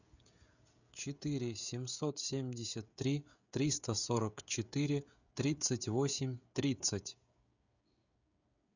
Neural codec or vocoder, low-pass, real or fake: codec, 16 kHz, 16 kbps, FreqCodec, smaller model; 7.2 kHz; fake